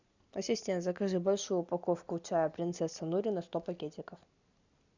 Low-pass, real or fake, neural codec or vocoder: 7.2 kHz; real; none